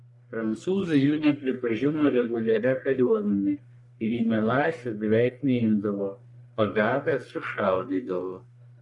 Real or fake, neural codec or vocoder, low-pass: fake; codec, 44.1 kHz, 1.7 kbps, Pupu-Codec; 10.8 kHz